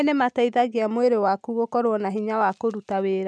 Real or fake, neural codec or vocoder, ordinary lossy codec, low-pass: real; none; none; none